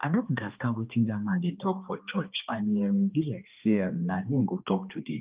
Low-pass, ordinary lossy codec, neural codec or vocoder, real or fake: 3.6 kHz; Opus, 24 kbps; codec, 16 kHz, 2 kbps, X-Codec, HuBERT features, trained on balanced general audio; fake